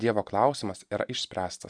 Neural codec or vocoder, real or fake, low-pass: none; real; 9.9 kHz